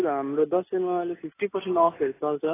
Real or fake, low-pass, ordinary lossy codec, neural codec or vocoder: real; 3.6 kHz; AAC, 16 kbps; none